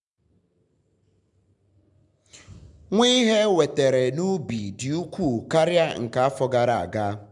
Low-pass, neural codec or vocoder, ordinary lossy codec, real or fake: 10.8 kHz; none; MP3, 96 kbps; real